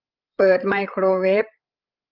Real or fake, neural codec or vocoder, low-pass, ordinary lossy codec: fake; codec, 16 kHz, 16 kbps, FreqCodec, larger model; 5.4 kHz; Opus, 32 kbps